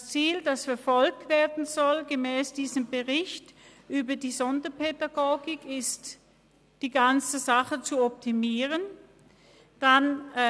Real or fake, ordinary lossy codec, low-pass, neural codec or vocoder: real; none; none; none